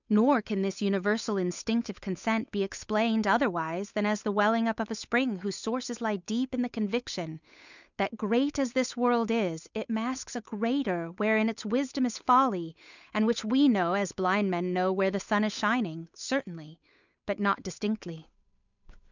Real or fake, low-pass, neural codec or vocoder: fake; 7.2 kHz; codec, 16 kHz, 8 kbps, FunCodec, trained on Chinese and English, 25 frames a second